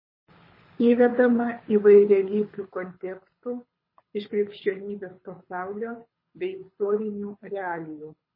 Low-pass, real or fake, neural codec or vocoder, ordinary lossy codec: 5.4 kHz; fake; codec, 24 kHz, 6 kbps, HILCodec; MP3, 24 kbps